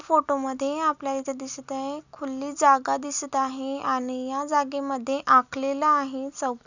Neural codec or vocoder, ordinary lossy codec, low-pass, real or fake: none; none; 7.2 kHz; real